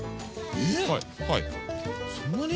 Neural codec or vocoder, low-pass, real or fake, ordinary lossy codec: none; none; real; none